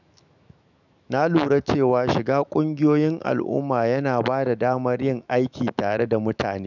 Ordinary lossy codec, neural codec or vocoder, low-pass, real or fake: none; autoencoder, 48 kHz, 128 numbers a frame, DAC-VAE, trained on Japanese speech; 7.2 kHz; fake